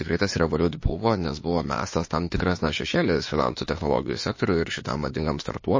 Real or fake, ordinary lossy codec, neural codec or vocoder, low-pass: fake; MP3, 32 kbps; autoencoder, 48 kHz, 32 numbers a frame, DAC-VAE, trained on Japanese speech; 7.2 kHz